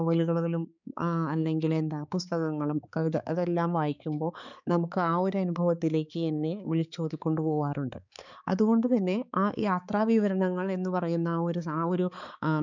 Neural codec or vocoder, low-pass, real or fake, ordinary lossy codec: codec, 16 kHz, 4 kbps, X-Codec, HuBERT features, trained on balanced general audio; 7.2 kHz; fake; none